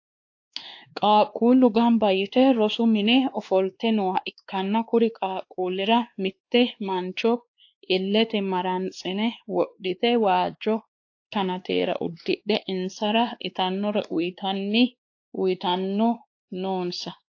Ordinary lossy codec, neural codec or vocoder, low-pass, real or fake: AAC, 48 kbps; codec, 16 kHz, 2 kbps, X-Codec, WavLM features, trained on Multilingual LibriSpeech; 7.2 kHz; fake